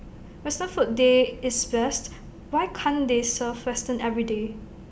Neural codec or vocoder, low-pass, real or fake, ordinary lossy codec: none; none; real; none